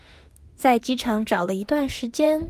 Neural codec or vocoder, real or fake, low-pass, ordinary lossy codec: autoencoder, 48 kHz, 32 numbers a frame, DAC-VAE, trained on Japanese speech; fake; 14.4 kHz; Opus, 32 kbps